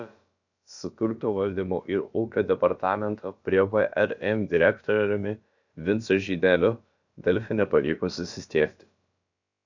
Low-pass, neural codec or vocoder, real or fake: 7.2 kHz; codec, 16 kHz, about 1 kbps, DyCAST, with the encoder's durations; fake